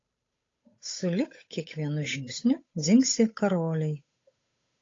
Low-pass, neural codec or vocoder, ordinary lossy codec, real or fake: 7.2 kHz; codec, 16 kHz, 8 kbps, FunCodec, trained on Chinese and English, 25 frames a second; AAC, 32 kbps; fake